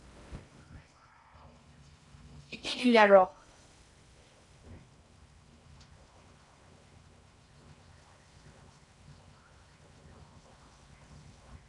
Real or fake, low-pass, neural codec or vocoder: fake; 10.8 kHz; codec, 16 kHz in and 24 kHz out, 0.6 kbps, FocalCodec, streaming, 4096 codes